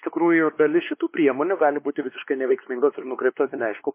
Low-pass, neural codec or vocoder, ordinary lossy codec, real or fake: 3.6 kHz; codec, 16 kHz, 2 kbps, X-Codec, WavLM features, trained on Multilingual LibriSpeech; MP3, 24 kbps; fake